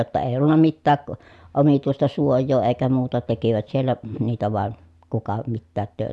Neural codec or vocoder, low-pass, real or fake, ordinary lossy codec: none; none; real; none